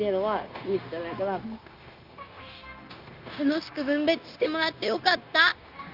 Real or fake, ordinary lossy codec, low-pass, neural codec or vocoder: fake; Opus, 32 kbps; 5.4 kHz; codec, 16 kHz, 0.9 kbps, LongCat-Audio-Codec